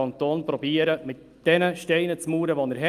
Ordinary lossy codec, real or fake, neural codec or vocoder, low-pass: Opus, 32 kbps; real; none; 14.4 kHz